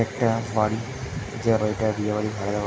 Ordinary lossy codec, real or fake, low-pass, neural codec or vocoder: none; real; none; none